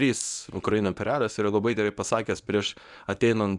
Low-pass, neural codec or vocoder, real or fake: 10.8 kHz; codec, 24 kHz, 0.9 kbps, WavTokenizer, medium speech release version 1; fake